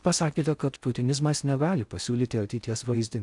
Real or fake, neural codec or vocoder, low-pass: fake; codec, 16 kHz in and 24 kHz out, 0.6 kbps, FocalCodec, streaming, 4096 codes; 10.8 kHz